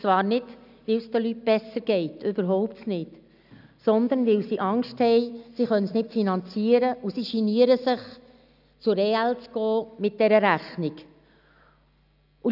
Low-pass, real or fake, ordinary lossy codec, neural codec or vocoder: 5.4 kHz; real; none; none